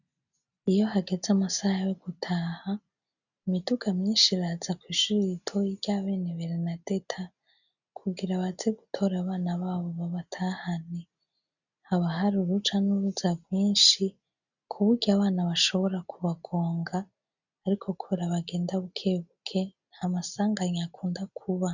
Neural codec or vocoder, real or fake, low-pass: none; real; 7.2 kHz